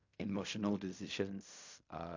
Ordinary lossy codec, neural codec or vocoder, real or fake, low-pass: MP3, 48 kbps; codec, 16 kHz in and 24 kHz out, 0.4 kbps, LongCat-Audio-Codec, fine tuned four codebook decoder; fake; 7.2 kHz